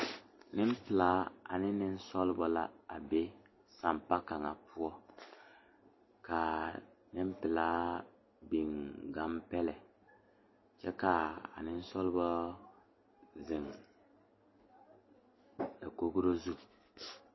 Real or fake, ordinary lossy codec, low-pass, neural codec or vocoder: real; MP3, 24 kbps; 7.2 kHz; none